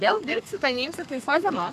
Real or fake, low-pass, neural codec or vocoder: fake; 14.4 kHz; codec, 32 kHz, 1.9 kbps, SNAC